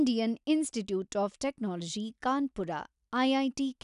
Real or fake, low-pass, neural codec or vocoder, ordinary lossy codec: real; 10.8 kHz; none; none